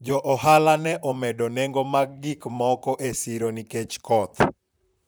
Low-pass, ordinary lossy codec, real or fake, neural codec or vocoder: none; none; fake; vocoder, 44.1 kHz, 128 mel bands, Pupu-Vocoder